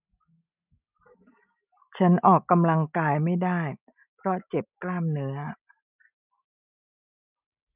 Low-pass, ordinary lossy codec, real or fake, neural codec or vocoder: 3.6 kHz; none; real; none